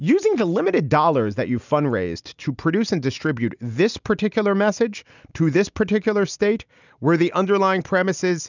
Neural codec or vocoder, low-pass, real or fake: none; 7.2 kHz; real